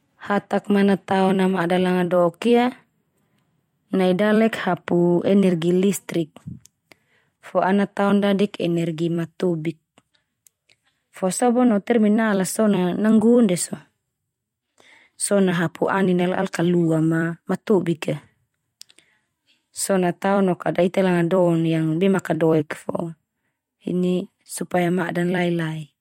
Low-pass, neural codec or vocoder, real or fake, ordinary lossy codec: 19.8 kHz; vocoder, 44.1 kHz, 128 mel bands every 256 samples, BigVGAN v2; fake; MP3, 64 kbps